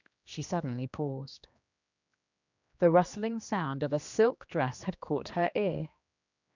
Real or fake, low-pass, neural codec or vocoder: fake; 7.2 kHz; codec, 16 kHz, 2 kbps, X-Codec, HuBERT features, trained on general audio